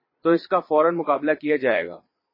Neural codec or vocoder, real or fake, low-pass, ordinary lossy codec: vocoder, 22.05 kHz, 80 mel bands, Vocos; fake; 5.4 kHz; MP3, 24 kbps